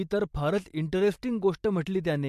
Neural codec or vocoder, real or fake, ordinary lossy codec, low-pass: vocoder, 44.1 kHz, 128 mel bands every 256 samples, BigVGAN v2; fake; MP3, 64 kbps; 14.4 kHz